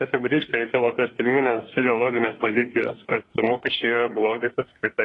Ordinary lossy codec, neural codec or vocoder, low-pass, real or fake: AAC, 32 kbps; codec, 24 kHz, 1 kbps, SNAC; 10.8 kHz; fake